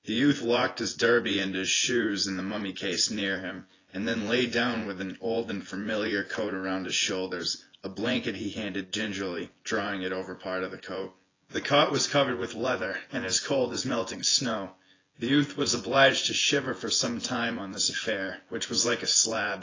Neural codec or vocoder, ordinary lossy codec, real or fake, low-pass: vocoder, 24 kHz, 100 mel bands, Vocos; AAC, 32 kbps; fake; 7.2 kHz